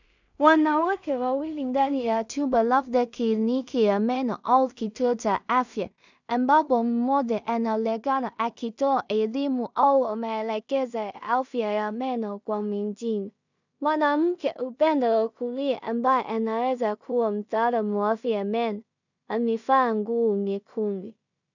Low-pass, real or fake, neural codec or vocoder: 7.2 kHz; fake; codec, 16 kHz in and 24 kHz out, 0.4 kbps, LongCat-Audio-Codec, two codebook decoder